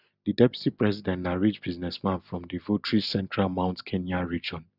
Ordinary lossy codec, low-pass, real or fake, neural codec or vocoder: none; 5.4 kHz; real; none